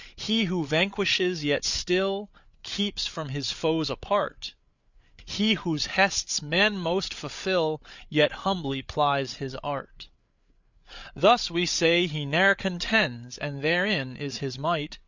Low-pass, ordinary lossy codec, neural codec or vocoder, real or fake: 7.2 kHz; Opus, 64 kbps; codec, 16 kHz, 8 kbps, FreqCodec, larger model; fake